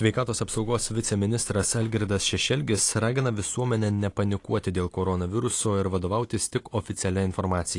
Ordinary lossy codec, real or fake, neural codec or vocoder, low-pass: AAC, 48 kbps; real; none; 10.8 kHz